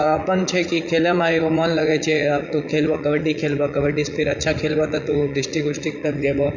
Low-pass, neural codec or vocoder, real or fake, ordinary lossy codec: 7.2 kHz; vocoder, 44.1 kHz, 80 mel bands, Vocos; fake; none